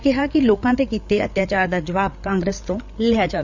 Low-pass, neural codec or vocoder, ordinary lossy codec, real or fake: 7.2 kHz; codec, 16 kHz, 8 kbps, FreqCodec, larger model; none; fake